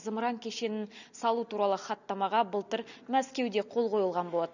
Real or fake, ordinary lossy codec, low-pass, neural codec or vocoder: real; none; 7.2 kHz; none